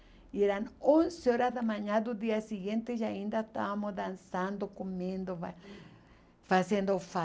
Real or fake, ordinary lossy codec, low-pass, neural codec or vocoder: real; none; none; none